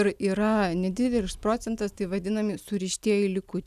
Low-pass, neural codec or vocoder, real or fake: 14.4 kHz; none; real